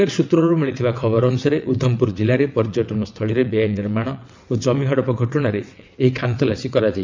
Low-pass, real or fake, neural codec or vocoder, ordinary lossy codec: 7.2 kHz; fake; vocoder, 22.05 kHz, 80 mel bands, WaveNeXt; MP3, 64 kbps